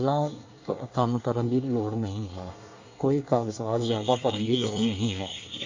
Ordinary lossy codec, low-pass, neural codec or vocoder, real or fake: AAC, 48 kbps; 7.2 kHz; codec, 24 kHz, 1 kbps, SNAC; fake